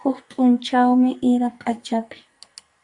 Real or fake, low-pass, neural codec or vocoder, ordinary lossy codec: fake; 10.8 kHz; codec, 44.1 kHz, 2.6 kbps, SNAC; Opus, 64 kbps